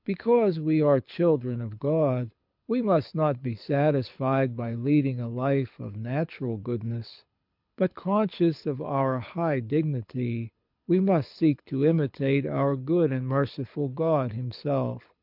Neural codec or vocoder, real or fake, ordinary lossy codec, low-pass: codec, 24 kHz, 6 kbps, HILCodec; fake; MP3, 48 kbps; 5.4 kHz